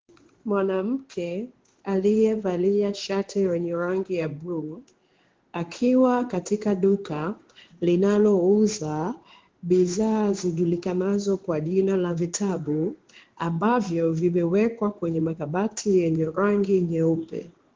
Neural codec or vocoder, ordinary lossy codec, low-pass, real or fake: codec, 16 kHz in and 24 kHz out, 1 kbps, XY-Tokenizer; Opus, 16 kbps; 7.2 kHz; fake